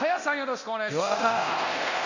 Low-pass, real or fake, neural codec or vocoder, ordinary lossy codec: 7.2 kHz; fake; codec, 24 kHz, 0.9 kbps, DualCodec; none